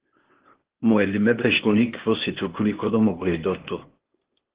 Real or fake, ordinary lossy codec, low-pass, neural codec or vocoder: fake; Opus, 16 kbps; 3.6 kHz; codec, 16 kHz, 0.8 kbps, ZipCodec